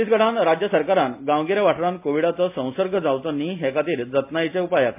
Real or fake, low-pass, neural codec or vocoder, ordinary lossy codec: real; 3.6 kHz; none; MP3, 32 kbps